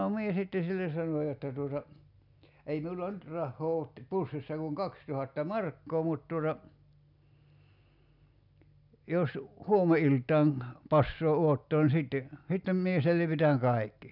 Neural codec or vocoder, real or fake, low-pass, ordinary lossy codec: none; real; 5.4 kHz; none